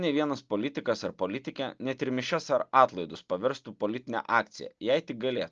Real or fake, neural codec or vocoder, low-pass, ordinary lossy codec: real; none; 7.2 kHz; Opus, 24 kbps